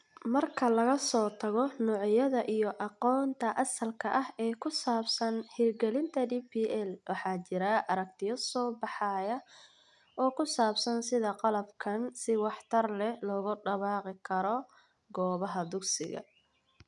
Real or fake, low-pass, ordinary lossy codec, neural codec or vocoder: real; 10.8 kHz; none; none